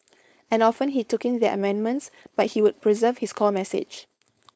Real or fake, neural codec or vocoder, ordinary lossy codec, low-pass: fake; codec, 16 kHz, 4.8 kbps, FACodec; none; none